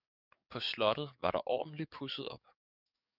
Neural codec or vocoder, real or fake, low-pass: codec, 44.1 kHz, 7.8 kbps, DAC; fake; 5.4 kHz